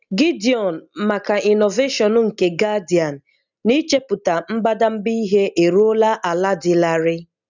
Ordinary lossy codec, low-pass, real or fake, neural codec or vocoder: none; 7.2 kHz; real; none